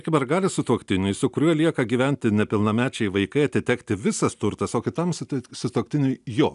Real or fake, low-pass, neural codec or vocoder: real; 10.8 kHz; none